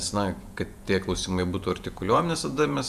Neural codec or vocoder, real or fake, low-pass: vocoder, 48 kHz, 128 mel bands, Vocos; fake; 14.4 kHz